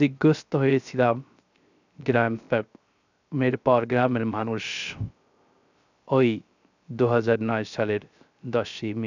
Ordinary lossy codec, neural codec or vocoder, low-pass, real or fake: none; codec, 16 kHz, 0.3 kbps, FocalCodec; 7.2 kHz; fake